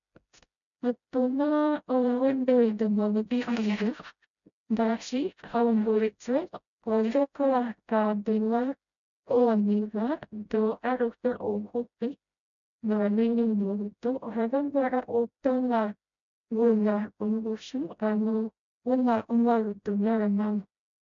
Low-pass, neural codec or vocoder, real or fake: 7.2 kHz; codec, 16 kHz, 0.5 kbps, FreqCodec, smaller model; fake